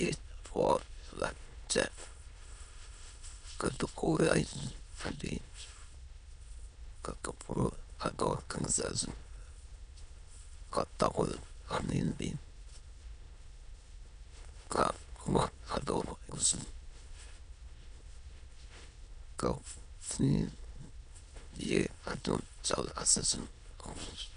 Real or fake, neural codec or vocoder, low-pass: fake; autoencoder, 22.05 kHz, a latent of 192 numbers a frame, VITS, trained on many speakers; 9.9 kHz